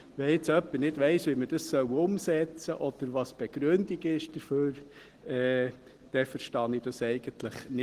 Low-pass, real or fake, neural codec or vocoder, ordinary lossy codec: 14.4 kHz; real; none; Opus, 16 kbps